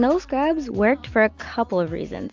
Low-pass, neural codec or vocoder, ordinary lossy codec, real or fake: 7.2 kHz; none; MP3, 64 kbps; real